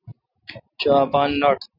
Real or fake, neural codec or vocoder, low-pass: real; none; 5.4 kHz